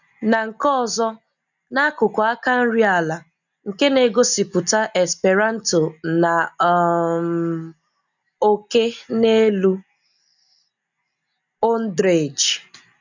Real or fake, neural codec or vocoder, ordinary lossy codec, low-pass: real; none; none; 7.2 kHz